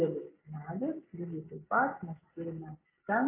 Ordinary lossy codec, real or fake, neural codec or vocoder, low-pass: AAC, 24 kbps; real; none; 3.6 kHz